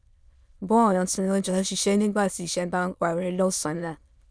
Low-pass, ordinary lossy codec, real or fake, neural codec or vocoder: none; none; fake; autoencoder, 22.05 kHz, a latent of 192 numbers a frame, VITS, trained on many speakers